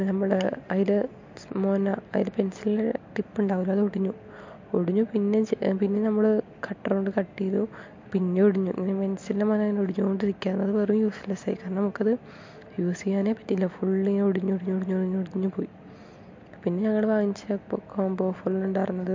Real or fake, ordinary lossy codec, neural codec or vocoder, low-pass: real; MP3, 48 kbps; none; 7.2 kHz